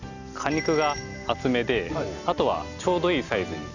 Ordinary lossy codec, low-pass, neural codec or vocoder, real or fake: none; 7.2 kHz; none; real